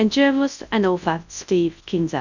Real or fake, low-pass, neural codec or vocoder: fake; 7.2 kHz; codec, 24 kHz, 0.9 kbps, WavTokenizer, large speech release